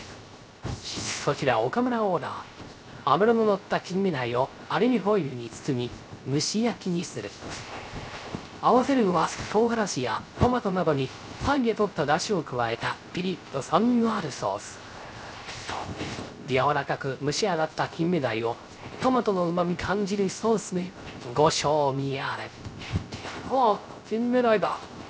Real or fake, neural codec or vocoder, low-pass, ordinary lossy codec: fake; codec, 16 kHz, 0.3 kbps, FocalCodec; none; none